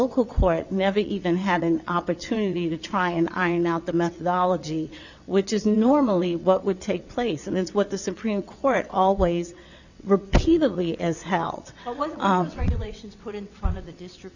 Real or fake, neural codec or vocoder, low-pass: fake; vocoder, 22.05 kHz, 80 mel bands, WaveNeXt; 7.2 kHz